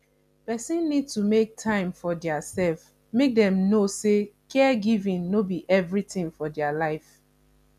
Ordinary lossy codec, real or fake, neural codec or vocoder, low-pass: none; real; none; 14.4 kHz